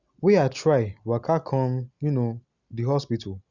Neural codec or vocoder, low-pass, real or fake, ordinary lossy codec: none; 7.2 kHz; real; Opus, 64 kbps